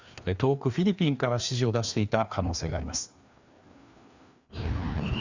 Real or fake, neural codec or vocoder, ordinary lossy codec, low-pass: fake; codec, 16 kHz, 2 kbps, FreqCodec, larger model; Opus, 64 kbps; 7.2 kHz